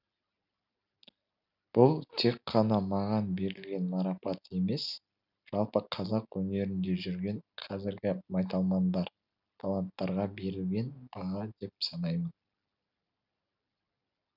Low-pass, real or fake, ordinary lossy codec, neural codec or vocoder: 5.4 kHz; real; none; none